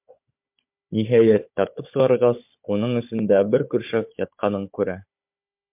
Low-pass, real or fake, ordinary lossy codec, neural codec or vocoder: 3.6 kHz; fake; MP3, 32 kbps; codec, 16 kHz, 16 kbps, FunCodec, trained on Chinese and English, 50 frames a second